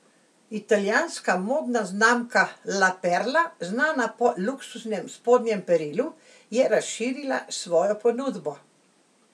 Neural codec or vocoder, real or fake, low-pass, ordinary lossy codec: none; real; none; none